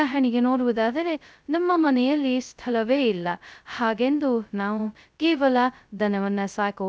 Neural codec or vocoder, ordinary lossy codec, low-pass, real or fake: codec, 16 kHz, 0.2 kbps, FocalCodec; none; none; fake